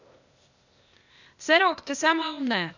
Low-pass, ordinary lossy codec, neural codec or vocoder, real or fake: 7.2 kHz; none; codec, 16 kHz, 0.8 kbps, ZipCodec; fake